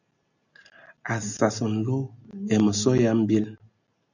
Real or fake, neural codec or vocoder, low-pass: real; none; 7.2 kHz